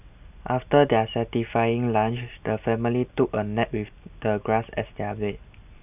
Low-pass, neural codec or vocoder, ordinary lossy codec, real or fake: 3.6 kHz; none; none; real